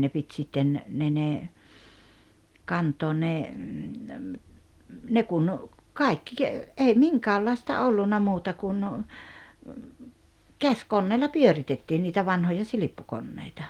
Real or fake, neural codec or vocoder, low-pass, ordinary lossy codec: real; none; 19.8 kHz; Opus, 24 kbps